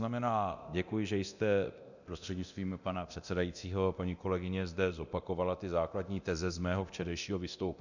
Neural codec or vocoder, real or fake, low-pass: codec, 24 kHz, 0.9 kbps, DualCodec; fake; 7.2 kHz